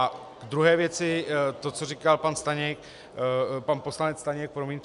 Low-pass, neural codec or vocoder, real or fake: 10.8 kHz; none; real